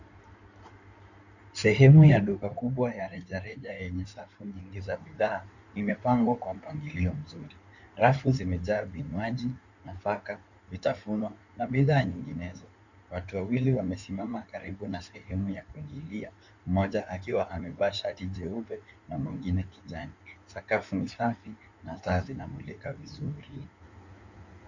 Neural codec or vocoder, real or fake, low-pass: codec, 16 kHz in and 24 kHz out, 2.2 kbps, FireRedTTS-2 codec; fake; 7.2 kHz